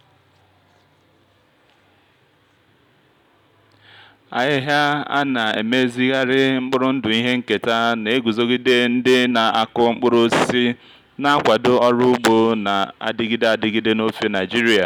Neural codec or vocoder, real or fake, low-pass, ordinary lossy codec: none; real; 19.8 kHz; none